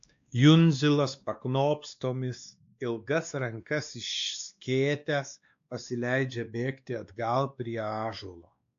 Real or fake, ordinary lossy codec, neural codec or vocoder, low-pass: fake; AAC, 64 kbps; codec, 16 kHz, 2 kbps, X-Codec, WavLM features, trained on Multilingual LibriSpeech; 7.2 kHz